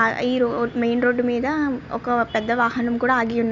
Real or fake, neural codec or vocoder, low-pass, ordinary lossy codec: real; none; 7.2 kHz; none